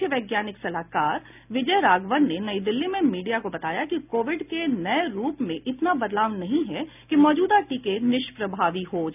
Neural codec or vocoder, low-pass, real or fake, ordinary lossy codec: none; 3.6 kHz; real; none